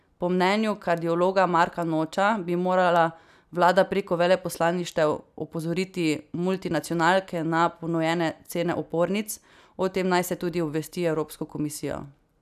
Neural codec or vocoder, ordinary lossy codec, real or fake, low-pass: none; none; real; 14.4 kHz